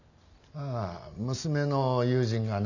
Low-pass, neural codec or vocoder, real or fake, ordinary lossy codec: 7.2 kHz; none; real; none